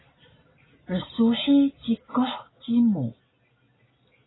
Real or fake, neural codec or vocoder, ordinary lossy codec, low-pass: real; none; AAC, 16 kbps; 7.2 kHz